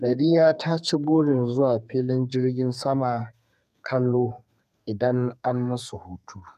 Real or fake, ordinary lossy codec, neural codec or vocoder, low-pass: fake; none; codec, 32 kHz, 1.9 kbps, SNAC; 14.4 kHz